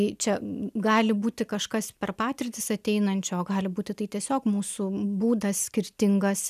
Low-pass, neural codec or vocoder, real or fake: 14.4 kHz; none; real